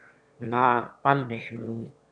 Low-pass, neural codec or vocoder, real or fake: 9.9 kHz; autoencoder, 22.05 kHz, a latent of 192 numbers a frame, VITS, trained on one speaker; fake